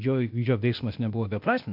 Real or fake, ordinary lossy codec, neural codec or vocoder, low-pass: fake; MP3, 48 kbps; codec, 16 kHz, 0.8 kbps, ZipCodec; 5.4 kHz